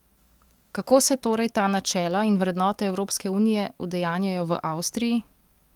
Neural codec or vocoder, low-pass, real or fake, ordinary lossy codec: codec, 44.1 kHz, 7.8 kbps, DAC; 19.8 kHz; fake; Opus, 32 kbps